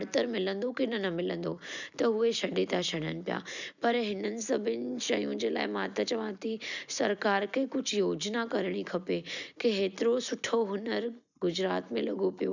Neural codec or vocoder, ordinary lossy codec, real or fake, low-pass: none; none; real; 7.2 kHz